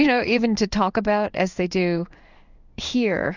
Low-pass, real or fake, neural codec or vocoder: 7.2 kHz; fake; codec, 16 kHz in and 24 kHz out, 1 kbps, XY-Tokenizer